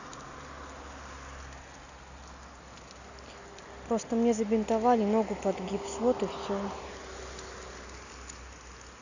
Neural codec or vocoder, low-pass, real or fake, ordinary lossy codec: none; 7.2 kHz; real; none